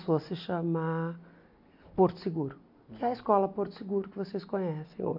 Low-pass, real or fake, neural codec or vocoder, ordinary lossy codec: 5.4 kHz; real; none; AAC, 48 kbps